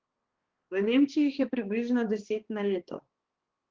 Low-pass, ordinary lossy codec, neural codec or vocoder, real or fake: 7.2 kHz; Opus, 16 kbps; codec, 16 kHz, 2 kbps, X-Codec, HuBERT features, trained on balanced general audio; fake